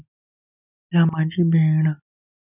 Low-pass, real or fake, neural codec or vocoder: 3.6 kHz; real; none